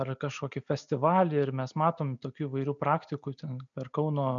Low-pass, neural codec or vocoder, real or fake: 7.2 kHz; none; real